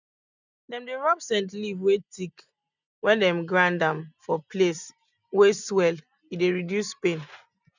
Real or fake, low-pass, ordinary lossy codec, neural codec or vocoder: real; 7.2 kHz; none; none